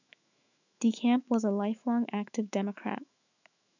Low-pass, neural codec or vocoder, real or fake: 7.2 kHz; autoencoder, 48 kHz, 128 numbers a frame, DAC-VAE, trained on Japanese speech; fake